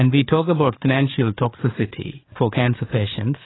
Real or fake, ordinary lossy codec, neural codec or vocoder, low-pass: fake; AAC, 16 kbps; codec, 16 kHz, 4 kbps, FreqCodec, larger model; 7.2 kHz